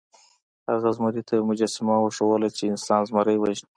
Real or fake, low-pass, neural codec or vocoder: real; 9.9 kHz; none